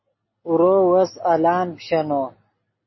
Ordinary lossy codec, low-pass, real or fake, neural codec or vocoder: MP3, 24 kbps; 7.2 kHz; real; none